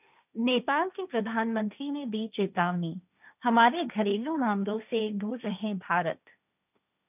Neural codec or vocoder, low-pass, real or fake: codec, 16 kHz, 1.1 kbps, Voila-Tokenizer; 3.6 kHz; fake